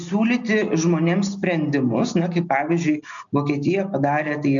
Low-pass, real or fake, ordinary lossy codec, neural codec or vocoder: 7.2 kHz; real; MP3, 96 kbps; none